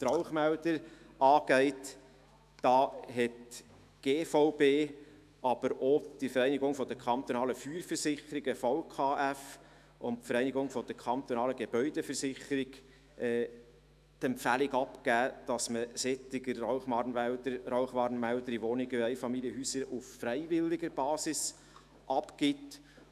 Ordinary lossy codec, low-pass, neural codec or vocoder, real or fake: none; 14.4 kHz; autoencoder, 48 kHz, 128 numbers a frame, DAC-VAE, trained on Japanese speech; fake